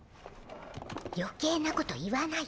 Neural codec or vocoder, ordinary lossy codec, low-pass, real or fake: none; none; none; real